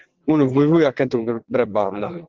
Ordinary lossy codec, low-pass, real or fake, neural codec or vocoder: Opus, 16 kbps; 7.2 kHz; fake; vocoder, 22.05 kHz, 80 mel bands, WaveNeXt